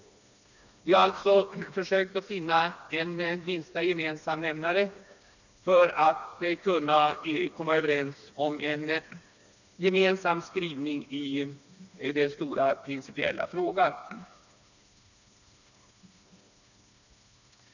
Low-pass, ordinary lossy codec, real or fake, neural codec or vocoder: 7.2 kHz; none; fake; codec, 16 kHz, 2 kbps, FreqCodec, smaller model